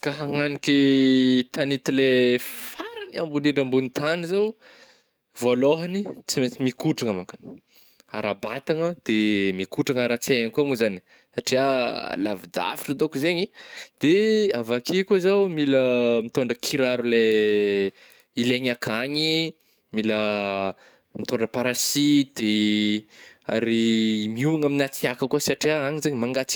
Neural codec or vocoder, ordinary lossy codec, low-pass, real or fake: codec, 44.1 kHz, 7.8 kbps, DAC; none; none; fake